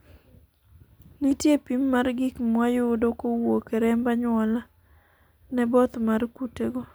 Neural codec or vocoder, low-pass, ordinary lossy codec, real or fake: none; none; none; real